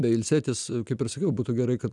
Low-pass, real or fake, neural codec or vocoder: 10.8 kHz; real; none